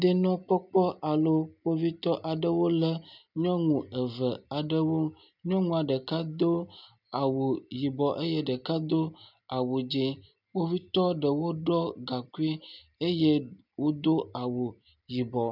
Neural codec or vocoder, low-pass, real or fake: none; 5.4 kHz; real